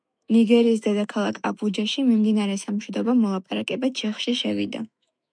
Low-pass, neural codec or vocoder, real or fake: 9.9 kHz; autoencoder, 48 kHz, 128 numbers a frame, DAC-VAE, trained on Japanese speech; fake